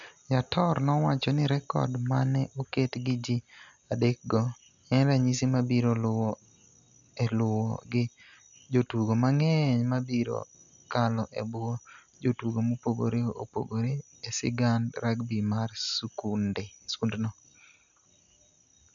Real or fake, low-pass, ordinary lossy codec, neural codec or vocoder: real; 7.2 kHz; none; none